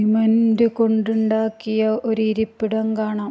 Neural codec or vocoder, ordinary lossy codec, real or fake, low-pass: none; none; real; none